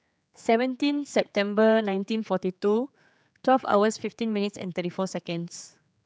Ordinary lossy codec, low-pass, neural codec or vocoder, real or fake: none; none; codec, 16 kHz, 4 kbps, X-Codec, HuBERT features, trained on general audio; fake